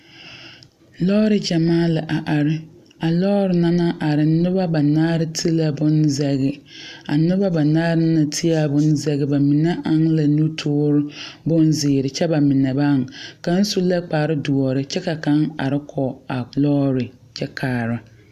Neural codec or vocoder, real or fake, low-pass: none; real; 14.4 kHz